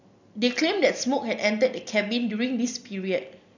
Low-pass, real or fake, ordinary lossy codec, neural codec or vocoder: 7.2 kHz; real; none; none